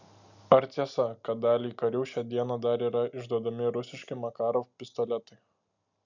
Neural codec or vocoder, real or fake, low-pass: none; real; 7.2 kHz